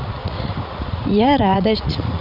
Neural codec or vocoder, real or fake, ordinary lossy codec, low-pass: vocoder, 44.1 kHz, 80 mel bands, Vocos; fake; none; 5.4 kHz